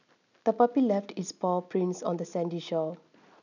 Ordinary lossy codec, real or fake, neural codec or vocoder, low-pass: none; real; none; 7.2 kHz